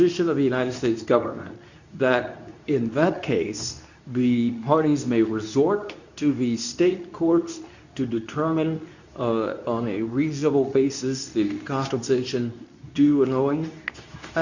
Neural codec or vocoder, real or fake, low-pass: codec, 24 kHz, 0.9 kbps, WavTokenizer, medium speech release version 2; fake; 7.2 kHz